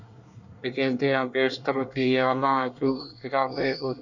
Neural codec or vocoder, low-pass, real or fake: codec, 24 kHz, 1 kbps, SNAC; 7.2 kHz; fake